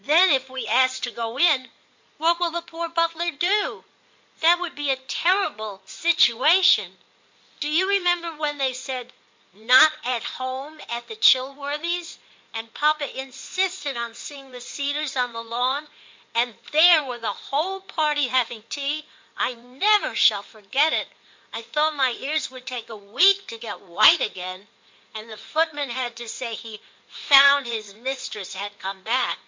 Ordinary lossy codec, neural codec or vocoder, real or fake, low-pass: MP3, 64 kbps; codec, 16 kHz in and 24 kHz out, 2.2 kbps, FireRedTTS-2 codec; fake; 7.2 kHz